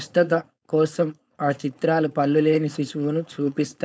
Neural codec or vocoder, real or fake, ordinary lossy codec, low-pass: codec, 16 kHz, 4.8 kbps, FACodec; fake; none; none